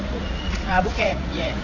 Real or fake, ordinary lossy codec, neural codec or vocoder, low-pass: fake; none; vocoder, 44.1 kHz, 80 mel bands, Vocos; 7.2 kHz